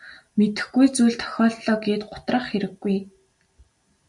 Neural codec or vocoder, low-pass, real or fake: none; 10.8 kHz; real